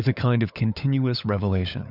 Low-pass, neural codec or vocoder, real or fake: 5.4 kHz; codec, 16 kHz, 16 kbps, FunCodec, trained on LibriTTS, 50 frames a second; fake